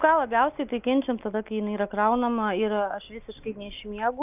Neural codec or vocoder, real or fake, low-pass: none; real; 3.6 kHz